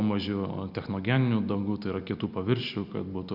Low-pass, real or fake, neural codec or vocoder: 5.4 kHz; real; none